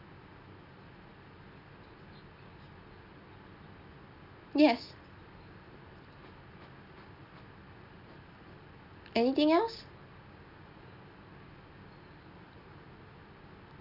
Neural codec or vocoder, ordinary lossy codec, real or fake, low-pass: none; none; real; 5.4 kHz